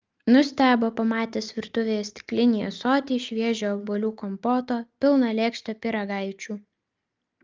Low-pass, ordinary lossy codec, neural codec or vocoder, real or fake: 7.2 kHz; Opus, 24 kbps; none; real